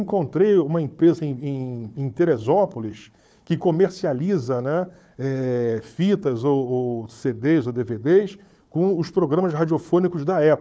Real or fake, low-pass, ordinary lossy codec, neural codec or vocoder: fake; none; none; codec, 16 kHz, 4 kbps, FunCodec, trained on Chinese and English, 50 frames a second